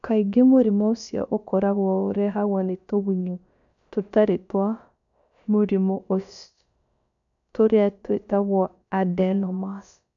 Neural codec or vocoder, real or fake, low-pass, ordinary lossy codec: codec, 16 kHz, about 1 kbps, DyCAST, with the encoder's durations; fake; 7.2 kHz; none